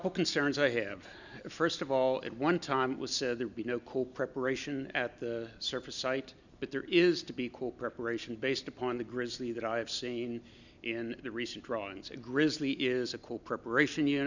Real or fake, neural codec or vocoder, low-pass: real; none; 7.2 kHz